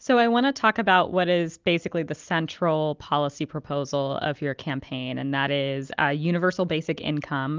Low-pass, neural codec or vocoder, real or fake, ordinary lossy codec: 7.2 kHz; none; real; Opus, 32 kbps